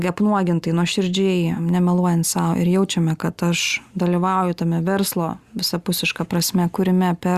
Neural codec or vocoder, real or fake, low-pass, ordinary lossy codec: none; real; 14.4 kHz; Opus, 64 kbps